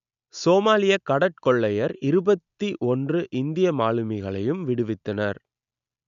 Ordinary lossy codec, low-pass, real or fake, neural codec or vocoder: none; 7.2 kHz; real; none